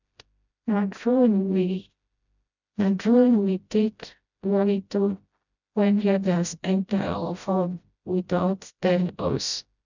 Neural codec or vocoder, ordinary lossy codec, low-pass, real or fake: codec, 16 kHz, 0.5 kbps, FreqCodec, smaller model; none; 7.2 kHz; fake